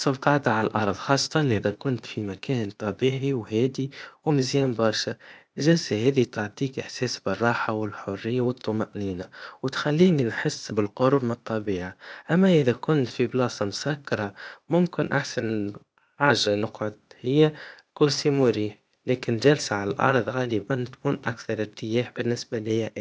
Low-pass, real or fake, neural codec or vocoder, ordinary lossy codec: none; fake; codec, 16 kHz, 0.8 kbps, ZipCodec; none